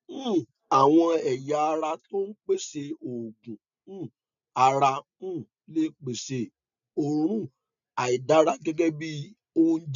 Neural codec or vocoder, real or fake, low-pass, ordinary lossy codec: none; real; 7.2 kHz; none